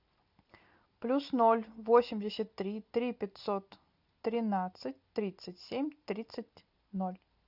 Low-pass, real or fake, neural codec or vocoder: 5.4 kHz; real; none